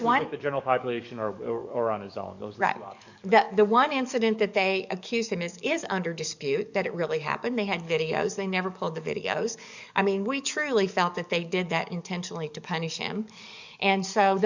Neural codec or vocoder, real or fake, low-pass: codec, 44.1 kHz, 7.8 kbps, DAC; fake; 7.2 kHz